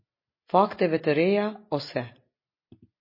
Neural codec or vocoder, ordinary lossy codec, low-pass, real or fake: none; MP3, 24 kbps; 5.4 kHz; real